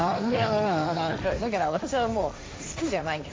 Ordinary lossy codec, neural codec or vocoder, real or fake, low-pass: none; codec, 16 kHz, 1.1 kbps, Voila-Tokenizer; fake; none